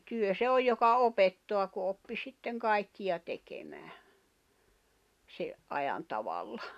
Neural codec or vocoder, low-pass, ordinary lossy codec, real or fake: none; 14.4 kHz; none; real